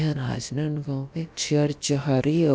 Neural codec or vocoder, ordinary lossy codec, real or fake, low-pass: codec, 16 kHz, about 1 kbps, DyCAST, with the encoder's durations; none; fake; none